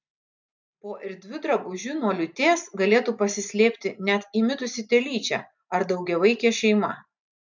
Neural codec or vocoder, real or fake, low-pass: none; real; 7.2 kHz